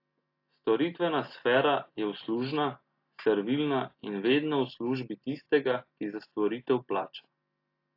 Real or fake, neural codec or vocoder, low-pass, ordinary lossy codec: real; none; 5.4 kHz; AAC, 32 kbps